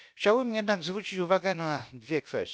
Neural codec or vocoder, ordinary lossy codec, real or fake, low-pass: codec, 16 kHz, about 1 kbps, DyCAST, with the encoder's durations; none; fake; none